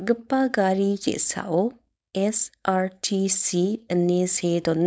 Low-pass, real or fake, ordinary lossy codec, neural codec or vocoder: none; fake; none; codec, 16 kHz, 4.8 kbps, FACodec